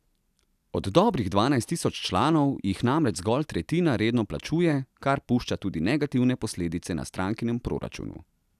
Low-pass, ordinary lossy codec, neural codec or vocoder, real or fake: 14.4 kHz; none; none; real